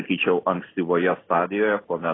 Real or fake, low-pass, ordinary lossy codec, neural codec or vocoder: real; 7.2 kHz; AAC, 16 kbps; none